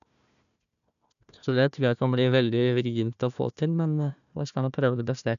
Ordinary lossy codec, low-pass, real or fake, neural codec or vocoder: none; 7.2 kHz; fake; codec, 16 kHz, 1 kbps, FunCodec, trained on Chinese and English, 50 frames a second